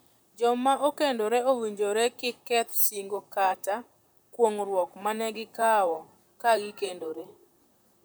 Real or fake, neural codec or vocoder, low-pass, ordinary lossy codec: fake; vocoder, 44.1 kHz, 128 mel bands, Pupu-Vocoder; none; none